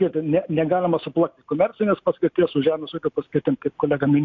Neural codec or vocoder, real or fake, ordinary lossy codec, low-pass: none; real; MP3, 64 kbps; 7.2 kHz